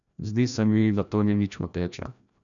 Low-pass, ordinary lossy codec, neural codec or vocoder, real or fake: 7.2 kHz; none; codec, 16 kHz, 1 kbps, FreqCodec, larger model; fake